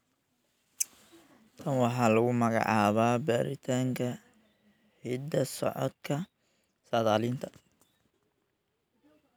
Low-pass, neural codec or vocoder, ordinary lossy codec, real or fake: none; none; none; real